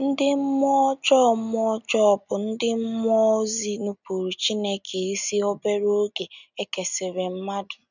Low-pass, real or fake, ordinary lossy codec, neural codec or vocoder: 7.2 kHz; real; none; none